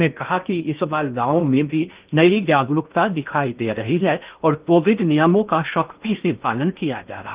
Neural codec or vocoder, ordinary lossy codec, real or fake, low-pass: codec, 16 kHz in and 24 kHz out, 0.6 kbps, FocalCodec, streaming, 2048 codes; Opus, 32 kbps; fake; 3.6 kHz